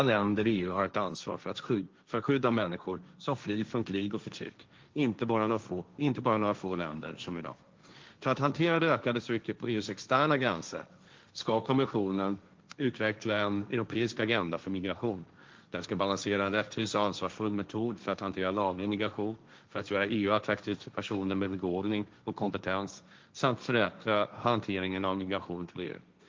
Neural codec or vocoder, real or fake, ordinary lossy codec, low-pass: codec, 16 kHz, 1.1 kbps, Voila-Tokenizer; fake; Opus, 24 kbps; 7.2 kHz